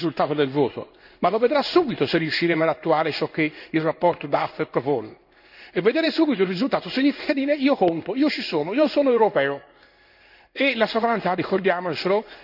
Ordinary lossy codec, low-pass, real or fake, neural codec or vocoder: none; 5.4 kHz; fake; codec, 16 kHz in and 24 kHz out, 1 kbps, XY-Tokenizer